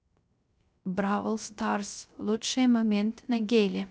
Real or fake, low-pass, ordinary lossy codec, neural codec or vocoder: fake; none; none; codec, 16 kHz, 0.3 kbps, FocalCodec